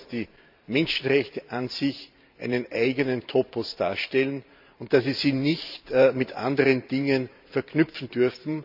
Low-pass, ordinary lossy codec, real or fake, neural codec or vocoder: 5.4 kHz; none; fake; vocoder, 44.1 kHz, 128 mel bands every 512 samples, BigVGAN v2